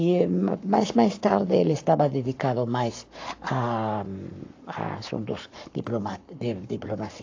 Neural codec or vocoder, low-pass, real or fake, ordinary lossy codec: codec, 44.1 kHz, 7.8 kbps, Pupu-Codec; 7.2 kHz; fake; AAC, 48 kbps